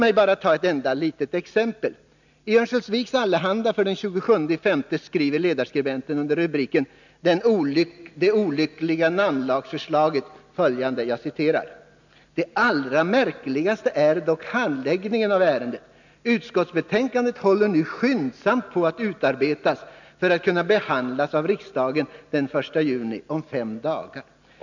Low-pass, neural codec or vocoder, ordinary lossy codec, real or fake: 7.2 kHz; none; none; real